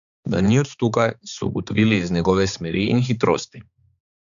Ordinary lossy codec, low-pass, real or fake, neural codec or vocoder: none; 7.2 kHz; fake; codec, 16 kHz, 4 kbps, X-Codec, HuBERT features, trained on balanced general audio